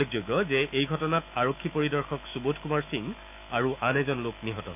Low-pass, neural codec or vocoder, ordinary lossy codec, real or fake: 3.6 kHz; autoencoder, 48 kHz, 128 numbers a frame, DAC-VAE, trained on Japanese speech; none; fake